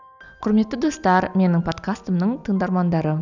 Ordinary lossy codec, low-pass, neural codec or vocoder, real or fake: none; 7.2 kHz; none; real